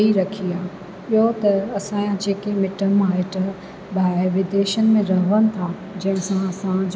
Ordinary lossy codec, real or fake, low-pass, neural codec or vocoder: none; real; none; none